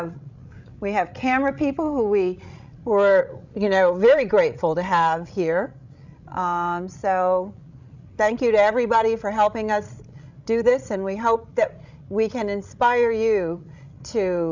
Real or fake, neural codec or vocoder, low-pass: fake; codec, 16 kHz, 16 kbps, FreqCodec, larger model; 7.2 kHz